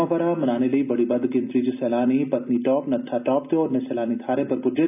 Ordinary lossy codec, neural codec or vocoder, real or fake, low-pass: none; none; real; 3.6 kHz